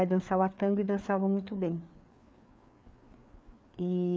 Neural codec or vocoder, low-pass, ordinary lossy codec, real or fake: codec, 16 kHz, 4 kbps, FreqCodec, larger model; none; none; fake